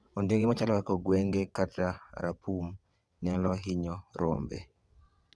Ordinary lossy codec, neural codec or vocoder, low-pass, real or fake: none; vocoder, 22.05 kHz, 80 mel bands, WaveNeXt; none; fake